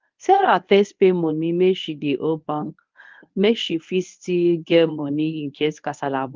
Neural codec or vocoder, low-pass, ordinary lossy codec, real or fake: codec, 24 kHz, 0.9 kbps, WavTokenizer, medium speech release version 1; 7.2 kHz; Opus, 24 kbps; fake